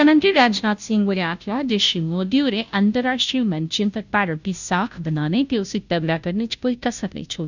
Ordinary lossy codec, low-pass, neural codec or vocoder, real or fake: none; 7.2 kHz; codec, 16 kHz, 0.5 kbps, FunCodec, trained on Chinese and English, 25 frames a second; fake